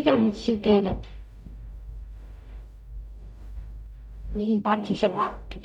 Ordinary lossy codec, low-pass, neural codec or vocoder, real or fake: none; 19.8 kHz; codec, 44.1 kHz, 0.9 kbps, DAC; fake